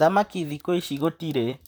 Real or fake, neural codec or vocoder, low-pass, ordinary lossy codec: fake; vocoder, 44.1 kHz, 128 mel bands, Pupu-Vocoder; none; none